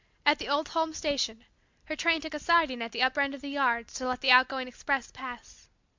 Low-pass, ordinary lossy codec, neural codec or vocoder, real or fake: 7.2 kHz; MP3, 64 kbps; none; real